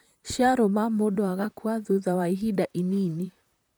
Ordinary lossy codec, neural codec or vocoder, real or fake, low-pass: none; vocoder, 44.1 kHz, 128 mel bands, Pupu-Vocoder; fake; none